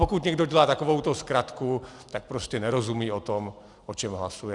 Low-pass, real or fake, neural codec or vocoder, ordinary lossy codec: 10.8 kHz; real; none; Opus, 64 kbps